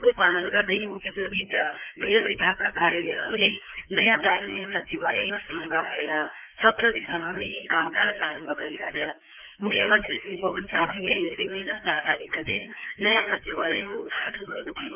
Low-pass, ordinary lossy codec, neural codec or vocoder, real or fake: 3.6 kHz; none; codec, 16 kHz, 2 kbps, FreqCodec, larger model; fake